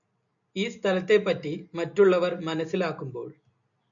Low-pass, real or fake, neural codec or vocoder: 7.2 kHz; real; none